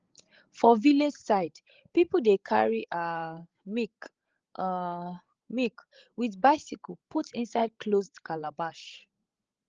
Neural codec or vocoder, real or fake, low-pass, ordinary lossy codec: codec, 16 kHz, 16 kbps, FreqCodec, larger model; fake; 7.2 kHz; Opus, 16 kbps